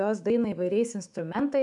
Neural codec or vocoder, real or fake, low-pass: autoencoder, 48 kHz, 128 numbers a frame, DAC-VAE, trained on Japanese speech; fake; 10.8 kHz